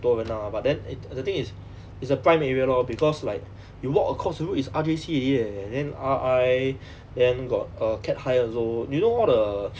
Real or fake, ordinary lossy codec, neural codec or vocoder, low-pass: real; none; none; none